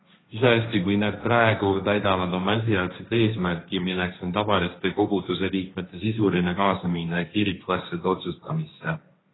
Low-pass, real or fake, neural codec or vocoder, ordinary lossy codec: 7.2 kHz; fake; codec, 16 kHz, 1.1 kbps, Voila-Tokenizer; AAC, 16 kbps